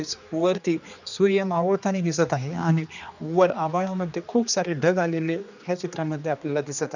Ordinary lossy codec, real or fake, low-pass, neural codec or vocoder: none; fake; 7.2 kHz; codec, 16 kHz, 2 kbps, X-Codec, HuBERT features, trained on general audio